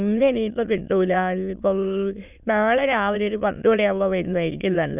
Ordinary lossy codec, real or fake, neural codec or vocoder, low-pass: none; fake; autoencoder, 22.05 kHz, a latent of 192 numbers a frame, VITS, trained on many speakers; 3.6 kHz